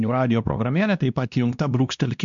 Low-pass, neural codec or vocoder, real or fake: 7.2 kHz; codec, 16 kHz, 1 kbps, X-Codec, WavLM features, trained on Multilingual LibriSpeech; fake